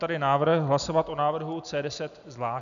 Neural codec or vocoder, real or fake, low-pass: none; real; 7.2 kHz